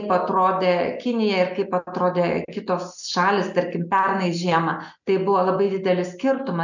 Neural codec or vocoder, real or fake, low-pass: none; real; 7.2 kHz